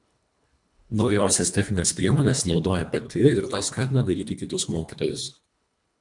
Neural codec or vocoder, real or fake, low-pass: codec, 24 kHz, 1.5 kbps, HILCodec; fake; 10.8 kHz